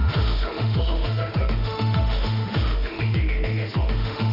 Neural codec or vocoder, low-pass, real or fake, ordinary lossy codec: autoencoder, 48 kHz, 32 numbers a frame, DAC-VAE, trained on Japanese speech; 5.4 kHz; fake; none